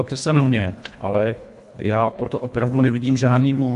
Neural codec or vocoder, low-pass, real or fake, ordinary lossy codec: codec, 24 kHz, 1.5 kbps, HILCodec; 10.8 kHz; fake; MP3, 64 kbps